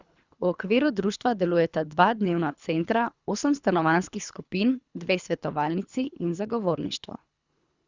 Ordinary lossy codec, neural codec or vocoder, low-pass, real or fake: Opus, 64 kbps; codec, 24 kHz, 3 kbps, HILCodec; 7.2 kHz; fake